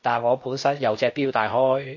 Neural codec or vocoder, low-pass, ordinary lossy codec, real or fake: codec, 16 kHz, 0.8 kbps, ZipCodec; 7.2 kHz; MP3, 32 kbps; fake